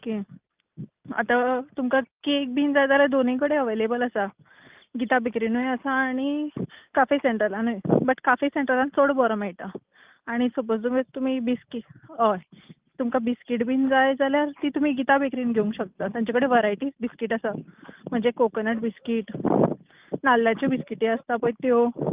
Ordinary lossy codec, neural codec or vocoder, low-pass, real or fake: Opus, 24 kbps; none; 3.6 kHz; real